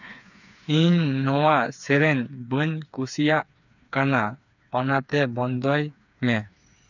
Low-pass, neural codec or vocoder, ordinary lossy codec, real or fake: 7.2 kHz; codec, 16 kHz, 4 kbps, FreqCodec, smaller model; none; fake